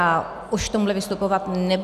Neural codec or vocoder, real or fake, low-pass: none; real; 14.4 kHz